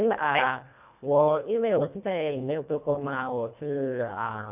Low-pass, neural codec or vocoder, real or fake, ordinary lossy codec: 3.6 kHz; codec, 24 kHz, 1.5 kbps, HILCodec; fake; none